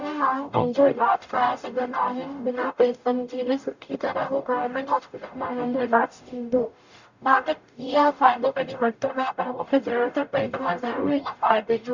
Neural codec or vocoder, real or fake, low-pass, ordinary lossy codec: codec, 44.1 kHz, 0.9 kbps, DAC; fake; 7.2 kHz; none